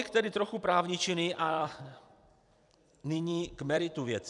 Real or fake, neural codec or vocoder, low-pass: fake; vocoder, 44.1 kHz, 128 mel bands every 256 samples, BigVGAN v2; 10.8 kHz